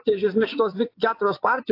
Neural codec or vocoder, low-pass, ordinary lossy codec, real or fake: vocoder, 24 kHz, 100 mel bands, Vocos; 5.4 kHz; AAC, 32 kbps; fake